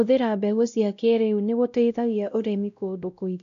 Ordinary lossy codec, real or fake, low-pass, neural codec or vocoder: none; fake; 7.2 kHz; codec, 16 kHz, 0.5 kbps, X-Codec, WavLM features, trained on Multilingual LibriSpeech